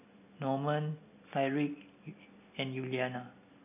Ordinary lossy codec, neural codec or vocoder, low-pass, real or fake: none; none; 3.6 kHz; real